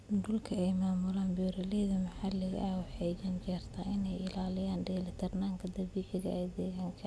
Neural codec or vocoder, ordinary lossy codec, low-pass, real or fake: none; none; none; real